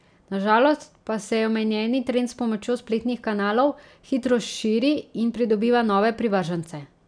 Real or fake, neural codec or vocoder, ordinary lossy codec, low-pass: real; none; none; 9.9 kHz